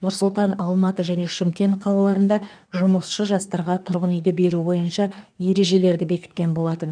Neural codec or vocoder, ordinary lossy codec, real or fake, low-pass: codec, 24 kHz, 1 kbps, SNAC; none; fake; 9.9 kHz